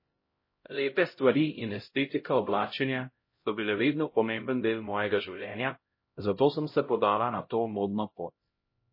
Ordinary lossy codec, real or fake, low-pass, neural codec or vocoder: MP3, 24 kbps; fake; 5.4 kHz; codec, 16 kHz, 0.5 kbps, X-Codec, HuBERT features, trained on LibriSpeech